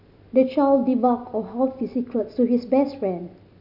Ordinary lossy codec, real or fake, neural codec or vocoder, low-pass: none; real; none; 5.4 kHz